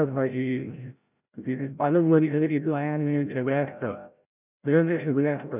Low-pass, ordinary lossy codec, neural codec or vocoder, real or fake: 3.6 kHz; none; codec, 16 kHz, 0.5 kbps, FreqCodec, larger model; fake